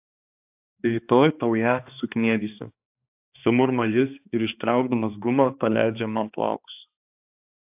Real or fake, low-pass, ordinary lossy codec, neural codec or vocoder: fake; 3.6 kHz; AAC, 32 kbps; codec, 16 kHz, 2 kbps, X-Codec, HuBERT features, trained on balanced general audio